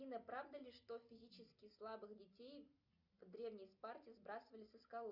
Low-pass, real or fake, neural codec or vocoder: 5.4 kHz; real; none